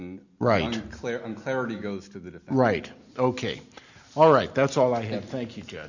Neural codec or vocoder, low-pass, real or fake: none; 7.2 kHz; real